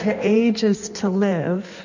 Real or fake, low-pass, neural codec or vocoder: fake; 7.2 kHz; codec, 16 kHz in and 24 kHz out, 1.1 kbps, FireRedTTS-2 codec